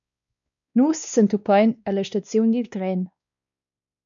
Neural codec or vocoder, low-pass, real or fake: codec, 16 kHz, 2 kbps, X-Codec, WavLM features, trained on Multilingual LibriSpeech; 7.2 kHz; fake